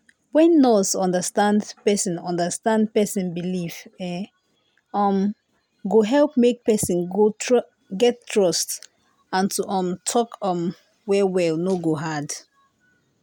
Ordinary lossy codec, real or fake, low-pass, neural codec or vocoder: none; real; none; none